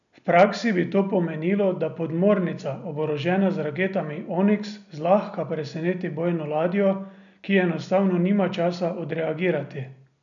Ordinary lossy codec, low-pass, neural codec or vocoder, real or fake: none; 7.2 kHz; none; real